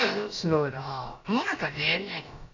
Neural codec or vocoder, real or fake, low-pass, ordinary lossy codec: codec, 16 kHz, about 1 kbps, DyCAST, with the encoder's durations; fake; 7.2 kHz; none